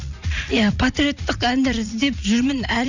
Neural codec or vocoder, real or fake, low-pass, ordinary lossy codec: vocoder, 44.1 kHz, 80 mel bands, Vocos; fake; 7.2 kHz; none